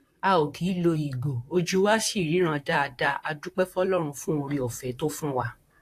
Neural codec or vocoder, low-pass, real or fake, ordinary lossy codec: vocoder, 44.1 kHz, 128 mel bands, Pupu-Vocoder; 14.4 kHz; fake; AAC, 64 kbps